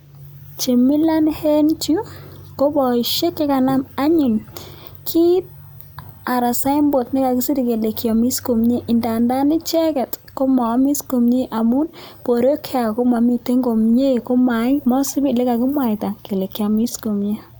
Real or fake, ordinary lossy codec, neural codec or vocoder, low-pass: real; none; none; none